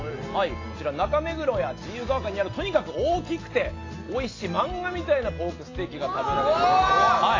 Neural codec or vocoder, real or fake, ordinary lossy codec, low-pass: none; real; AAC, 32 kbps; 7.2 kHz